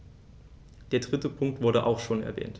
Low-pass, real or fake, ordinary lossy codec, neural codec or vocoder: none; real; none; none